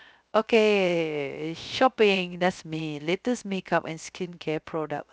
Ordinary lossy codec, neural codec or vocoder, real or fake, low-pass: none; codec, 16 kHz, 0.3 kbps, FocalCodec; fake; none